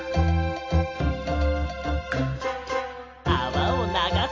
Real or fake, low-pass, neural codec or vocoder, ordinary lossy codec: real; 7.2 kHz; none; AAC, 32 kbps